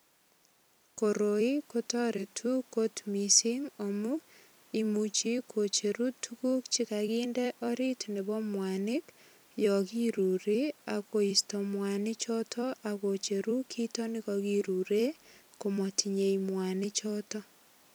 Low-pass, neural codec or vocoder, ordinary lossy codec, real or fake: none; vocoder, 44.1 kHz, 128 mel bands every 256 samples, BigVGAN v2; none; fake